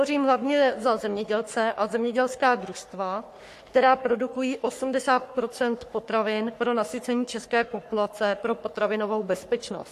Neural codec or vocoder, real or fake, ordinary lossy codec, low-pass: codec, 44.1 kHz, 3.4 kbps, Pupu-Codec; fake; AAC, 64 kbps; 14.4 kHz